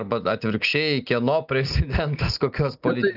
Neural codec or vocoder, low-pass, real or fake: none; 5.4 kHz; real